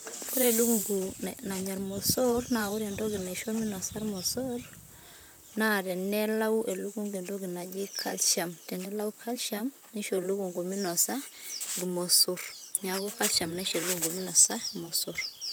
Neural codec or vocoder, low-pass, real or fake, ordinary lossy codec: vocoder, 44.1 kHz, 128 mel bands, Pupu-Vocoder; none; fake; none